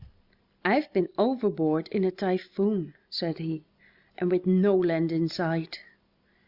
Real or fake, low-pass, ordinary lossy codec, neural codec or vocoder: real; 5.4 kHz; Opus, 64 kbps; none